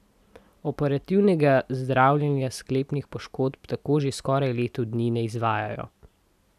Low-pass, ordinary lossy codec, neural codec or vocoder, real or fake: 14.4 kHz; none; none; real